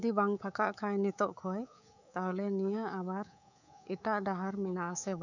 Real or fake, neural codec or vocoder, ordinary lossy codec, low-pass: fake; codec, 24 kHz, 3.1 kbps, DualCodec; none; 7.2 kHz